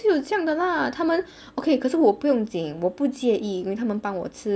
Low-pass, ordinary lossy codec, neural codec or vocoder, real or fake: none; none; none; real